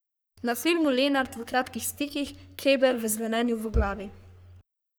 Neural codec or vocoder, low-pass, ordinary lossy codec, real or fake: codec, 44.1 kHz, 3.4 kbps, Pupu-Codec; none; none; fake